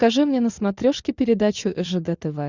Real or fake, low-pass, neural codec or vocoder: real; 7.2 kHz; none